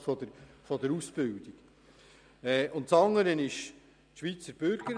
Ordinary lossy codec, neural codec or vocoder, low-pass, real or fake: none; none; 9.9 kHz; real